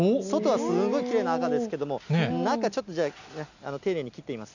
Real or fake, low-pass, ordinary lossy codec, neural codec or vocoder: real; 7.2 kHz; none; none